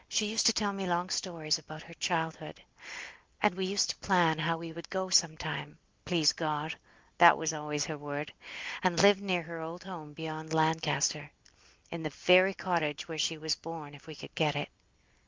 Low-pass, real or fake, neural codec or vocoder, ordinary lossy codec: 7.2 kHz; real; none; Opus, 24 kbps